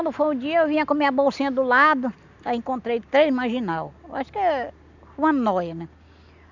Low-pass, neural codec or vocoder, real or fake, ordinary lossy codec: 7.2 kHz; none; real; none